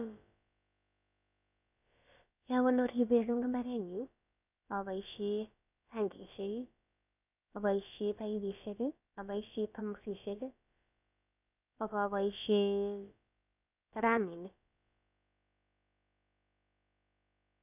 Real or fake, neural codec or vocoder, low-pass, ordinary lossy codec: fake; codec, 16 kHz, about 1 kbps, DyCAST, with the encoder's durations; 3.6 kHz; none